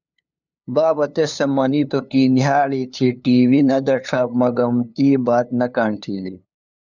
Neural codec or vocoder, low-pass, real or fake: codec, 16 kHz, 2 kbps, FunCodec, trained on LibriTTS, 25 frames a second; 7.2 kHz; fake